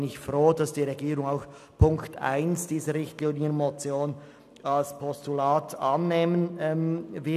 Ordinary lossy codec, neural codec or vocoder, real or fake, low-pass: none; none; real; 14.4 kHz